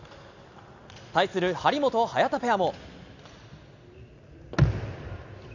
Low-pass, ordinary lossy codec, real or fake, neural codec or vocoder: 7.2 kHz; none; real; none